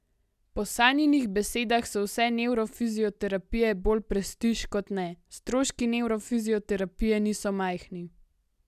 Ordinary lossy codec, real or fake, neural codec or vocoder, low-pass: none; real; none; 14.4 kHz